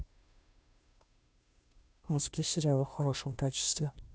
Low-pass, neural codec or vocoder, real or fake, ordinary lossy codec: none; codec, 16 kHz, 0.5 kbps, FunCodec, trained on Chinese and English, 25 frames a second; fake; none